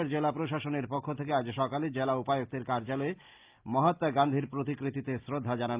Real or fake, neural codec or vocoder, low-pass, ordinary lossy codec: real; none; 3.6 kHz; Opus, 32 kbps